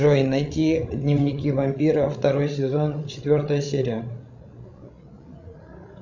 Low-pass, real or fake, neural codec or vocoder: 7.2 kHz; fake; codec, 16 kHz, 16 kbps, FreqCodec, larger model